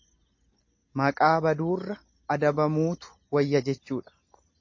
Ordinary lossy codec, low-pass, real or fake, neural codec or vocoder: MP3, 32 kbps; 7.2 kHz; real; none